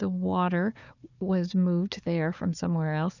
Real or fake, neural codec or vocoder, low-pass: fake; codec, 16 kHz, 4 kbps, FunCodec, trained on Chinese and English, 50 frames a second; 7.2 kHz